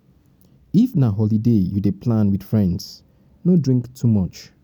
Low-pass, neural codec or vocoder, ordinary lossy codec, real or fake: 19.8 kHz; none; none; real